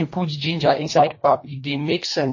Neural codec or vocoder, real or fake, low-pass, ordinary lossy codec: codec, 24 kHz, 1.5 kbps, HILCodec; fake; 7.2 kHz; MP3, 32 kbps